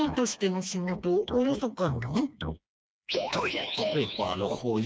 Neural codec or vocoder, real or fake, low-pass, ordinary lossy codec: codec, 16 kHz, 2 kbps, FreqCodec, smaller model; fake; none; none